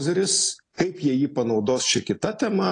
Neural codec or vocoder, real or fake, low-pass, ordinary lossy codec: none; real; 9.9 kHz; AAC, 32 kbps